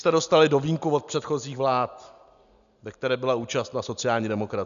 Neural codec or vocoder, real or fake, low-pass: none; real; 7.2 kHz